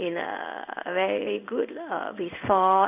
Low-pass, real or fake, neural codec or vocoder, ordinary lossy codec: 3.6 kHz; fake; codec, 16 kHz in and 24 kHz out, 1 kbps, XY-Tokenizer; none